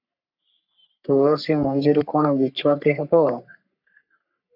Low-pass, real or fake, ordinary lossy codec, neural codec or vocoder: 5.4 kHz; fake; AAC, 48 kbps; codec, 44.1 kHz, 3.4 kbps, Pupu-Codec